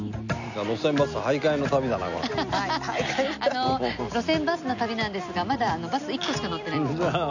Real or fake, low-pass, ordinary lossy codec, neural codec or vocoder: real; 7.2 kHz; none; none